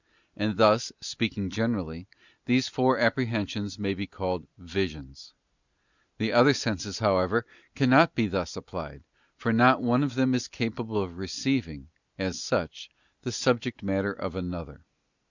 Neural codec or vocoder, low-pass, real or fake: none; 7.2 kHz; real